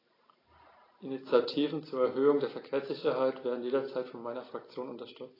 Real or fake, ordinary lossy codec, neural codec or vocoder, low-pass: real; AAC, 24 kbps; none; 5.4 kHz